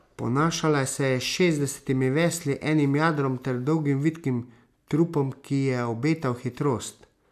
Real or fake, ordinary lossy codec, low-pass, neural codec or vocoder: real; none; 14.4 kHz; none